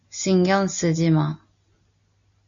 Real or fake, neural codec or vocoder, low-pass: real; none; 7.2 kHz